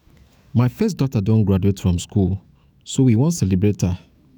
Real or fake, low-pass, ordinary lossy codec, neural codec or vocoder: fake; none; none; autoencoder, 48 kHz, 128 numbers a frame, DAC-VAE, trained on Japanese speech